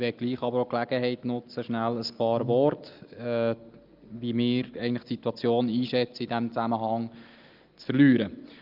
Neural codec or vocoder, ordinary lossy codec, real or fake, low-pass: none; Opus, 24 kbps; real; 5.4 kHz